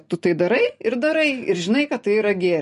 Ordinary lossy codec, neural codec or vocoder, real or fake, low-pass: MP3, 48 kbps; none; real; 14.4 kHz